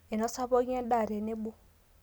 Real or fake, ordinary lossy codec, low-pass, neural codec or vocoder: real; none; none; none